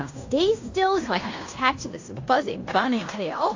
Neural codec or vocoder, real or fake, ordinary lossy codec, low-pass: codec, 16 kHz in and 24 kHz out, 0.9 kbps, LongCat-Audio-Codec, fine tuned four codebook decoder; fake; AAC, 48 kbps; 7.2 kHz